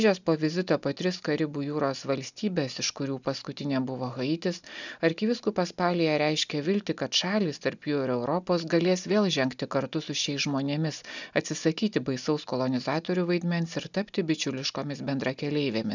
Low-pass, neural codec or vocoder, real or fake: 7.2 kHz; none; real